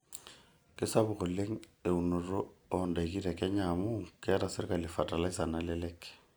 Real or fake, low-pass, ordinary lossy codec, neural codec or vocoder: real; none; none; none